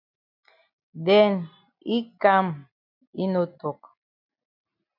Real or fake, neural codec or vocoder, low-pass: real; none; 5.4 kHz